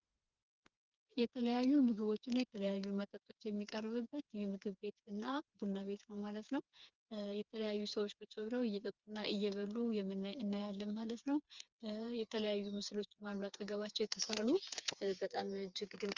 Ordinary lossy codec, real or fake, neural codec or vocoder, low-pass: Opus, 32 kbps; fake; codec, 16 kHz, 4 kbps, FreqCodec, smaller model; 7.2 kHz